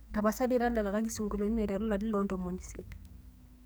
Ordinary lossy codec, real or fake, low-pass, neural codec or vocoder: none; fake; none; codec, 44.1 kHz, 2.6 kbps, SNAC